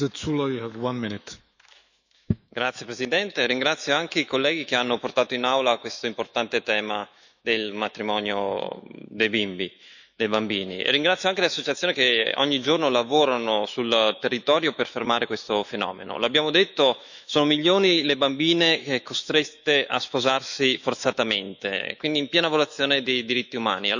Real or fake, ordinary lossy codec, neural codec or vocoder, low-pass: fake; none; autoencoder, 48 kHz, 128 numbers a frame, DAC-VAE, trained on Japanese speech; 7.2 kHz